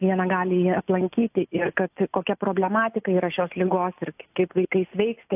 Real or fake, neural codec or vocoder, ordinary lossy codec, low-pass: real; none; AAC, 32 kbps; 3.6 kHz